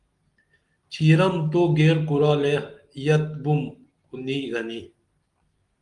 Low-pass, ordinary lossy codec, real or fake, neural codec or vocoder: 10.8 kHz; Opus, 24 kbps; real; none